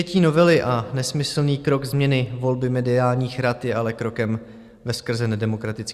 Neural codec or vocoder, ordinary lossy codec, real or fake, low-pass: none; Opus, 64 kbps; real; 14.4 kHz